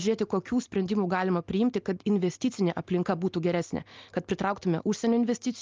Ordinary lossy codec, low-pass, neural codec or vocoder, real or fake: Opus, 24 kbps; 7.2 kHz; none; real